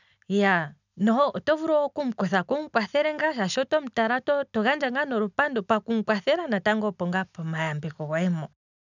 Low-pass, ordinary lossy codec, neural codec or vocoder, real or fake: 7.2 kHz; none; none; real